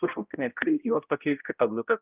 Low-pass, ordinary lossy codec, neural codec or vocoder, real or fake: 3.6 kHz; Opus, 24 kbps; codec, 16 kHz, 0.5 kbps, X-Codec, HuBERT features, trained on balanced general audio; fake